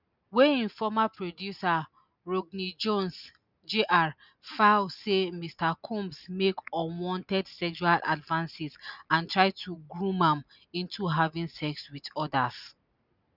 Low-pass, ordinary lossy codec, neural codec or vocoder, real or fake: 5.4 kHz; none; none; real